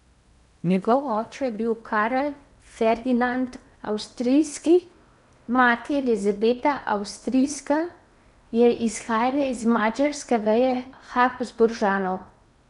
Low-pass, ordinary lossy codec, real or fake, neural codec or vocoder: 10.8 kHz; none; fake; codec, 16 kHz in and 24 kHz out, 0.8 kbps, FocalCodec, streaming, 65536 codes